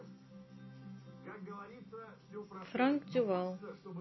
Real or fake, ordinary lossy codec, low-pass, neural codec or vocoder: real; MP3, 24 kbps; 7.2 kHz; none